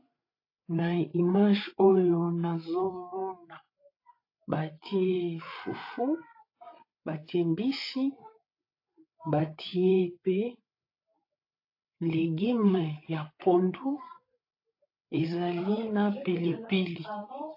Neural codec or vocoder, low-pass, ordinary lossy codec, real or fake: codec, 16 kHz, 8 kbps, FreqCodec, larger model; 5.4 kHz; AAC, 32 kbps; fake